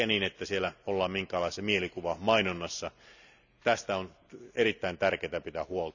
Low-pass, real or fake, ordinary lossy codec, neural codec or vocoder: 7.2 kHz; real; none; none